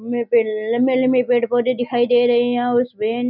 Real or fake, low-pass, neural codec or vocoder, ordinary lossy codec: real; 5.4 kHz; none; none